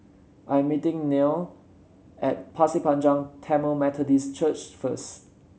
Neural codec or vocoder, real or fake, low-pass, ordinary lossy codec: none; real; none; none